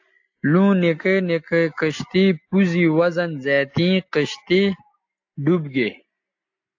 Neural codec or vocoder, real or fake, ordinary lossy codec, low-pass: none; real; AAC, 48 kbps; 7.2 kHz